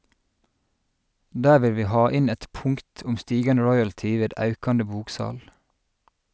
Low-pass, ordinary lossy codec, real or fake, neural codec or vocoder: none; none; real; none